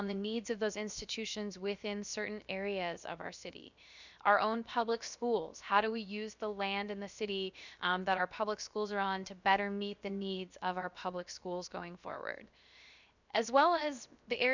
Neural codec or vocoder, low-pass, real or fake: codec, 16 kHz, 0.7 kbps, FocalCodec; 7.2 kHz; fake